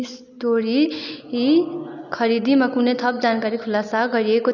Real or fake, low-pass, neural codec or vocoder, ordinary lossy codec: real; 7.2 kHz; none; none